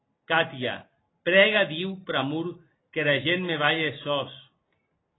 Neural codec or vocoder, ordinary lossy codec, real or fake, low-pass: none; AAC, 16 kbps; real; 7.2 kHz